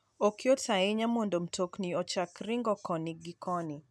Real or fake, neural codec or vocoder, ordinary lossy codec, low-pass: real; none; none; none